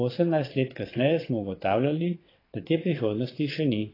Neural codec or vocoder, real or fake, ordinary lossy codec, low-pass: vocoder, 22.05 kHz, 80 mel bands, WaveNeXt; fake; AAC, 24 kbps; 5.4 kHz